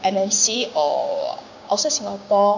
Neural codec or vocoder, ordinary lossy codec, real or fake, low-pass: codec, 16 kHz, 6 kbps, DAC; none; fake; 7.2 kHz